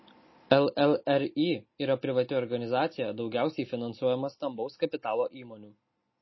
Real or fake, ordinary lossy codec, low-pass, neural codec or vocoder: real; MP3, 24 kbps; 7.2 kHz; none